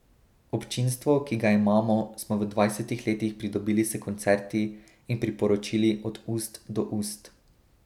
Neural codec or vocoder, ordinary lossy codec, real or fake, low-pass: none; none; real; 19.8 kHz